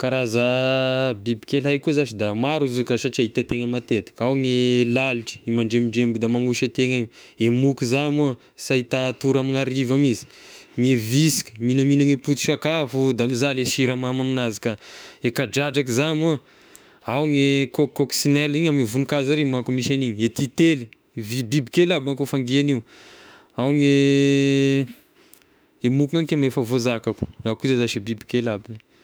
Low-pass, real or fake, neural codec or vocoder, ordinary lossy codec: none; fake; autoencoder, 48 kHz, 32 numbers a frame, DAC-VAE, trained on Japanese speech; none